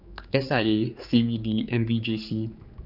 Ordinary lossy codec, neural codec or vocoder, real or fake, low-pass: none; codec, 16 kHz, 4 kbps, X-Codec, HuBERT features, trained on general audio; fake; 5.4 kHz